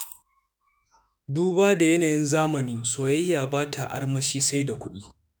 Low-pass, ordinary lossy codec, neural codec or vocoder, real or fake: none; none; autoencoder, 48 kHz, 32 numbers a frame, DAC-VAE, trained on Japanese speech; fake